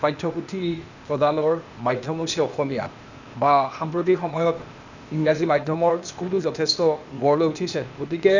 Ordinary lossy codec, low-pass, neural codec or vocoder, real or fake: none; 7.2 kHz; codec, 16 kHz, 0.8 kbps, ZipCodec; fake